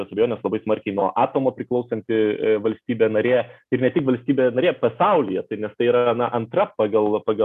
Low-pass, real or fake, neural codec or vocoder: 14.4 kHz; real; none